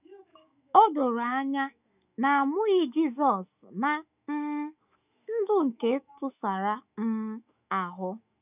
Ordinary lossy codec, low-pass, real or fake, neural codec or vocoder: none; 3.6 kHz; fake; autoencoder, 48 kHz, 128 numbers a frame, DAC-VAE, trained on Japanese speech